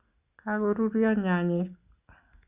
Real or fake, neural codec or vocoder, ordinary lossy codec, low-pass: real; none; Opus, 64 kbps; 3.6 kHz